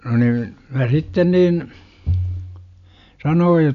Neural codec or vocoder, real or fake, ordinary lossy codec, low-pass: none; real; none; 7.2 kHz